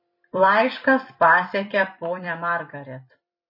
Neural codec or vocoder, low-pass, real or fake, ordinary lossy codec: vocoder, 44.1 kHz, 128 mel bands every 256 samples, BigVGAN v2; 5.4 kHz; fake; MP3, 24 kbps